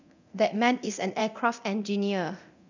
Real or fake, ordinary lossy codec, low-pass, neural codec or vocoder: fake; none; 7.2 kHz; codec, 24 kHz, 0.9 kbps, DualCodec